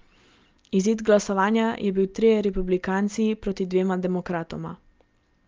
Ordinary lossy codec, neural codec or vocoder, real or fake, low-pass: Opus, 24 kbps; none; real; 7.2 kHz